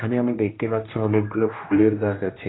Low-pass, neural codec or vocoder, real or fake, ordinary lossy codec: 7.2 kHz; codec, 16 kHz, 1 kbps, X-Codec, HuBERT features, trained on general audio; fake; AAC, 16 kbps